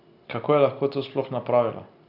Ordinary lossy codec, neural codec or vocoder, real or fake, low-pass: none; none; real; 5.4 kHz